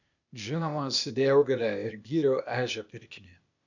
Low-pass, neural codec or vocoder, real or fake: 7.2 kHz; codec, 16 kHz, 0.8 kbps, ZipCodec; fake